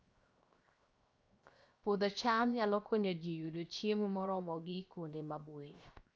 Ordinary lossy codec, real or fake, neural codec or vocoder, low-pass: none; fake; codec, 16 kHz, 0.7 kbps, FocalCodec; none